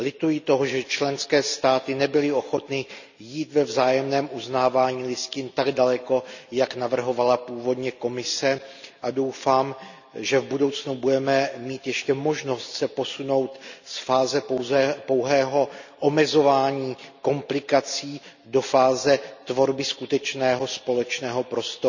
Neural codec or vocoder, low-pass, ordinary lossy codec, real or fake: none; 7.2 kHz; none; real